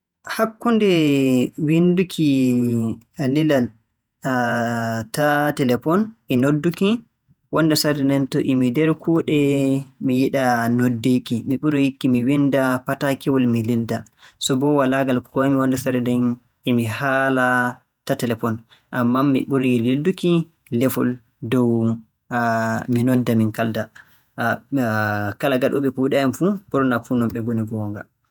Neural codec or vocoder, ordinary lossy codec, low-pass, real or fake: vocoder, 48 kHz, 128 mel bands, Vocos; none; 19.8 kHz; fake